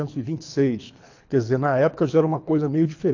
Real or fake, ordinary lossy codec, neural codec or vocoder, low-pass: fake; AAC, 48 kbps; codec, 24 kHz, 3 kbps, HILCodec; 7.2 kHz